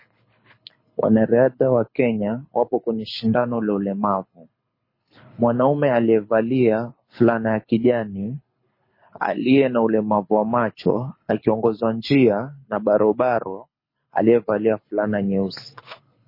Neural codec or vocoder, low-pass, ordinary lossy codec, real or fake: codec, 24 kHz, 6 kbps, HILCodec; 5.4 kHz; MP3, 24 kbps; fake